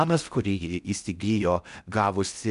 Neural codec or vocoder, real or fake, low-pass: codec, 16 kHz in and 24 kHz out, 0.6 kbps, FocalCodec, streaming, 4096 codes; fake; 10.8 kHz